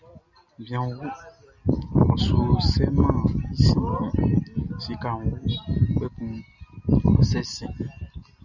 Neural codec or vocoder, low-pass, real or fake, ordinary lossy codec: none; 7.2 kHz; real; Opus, 64 kbps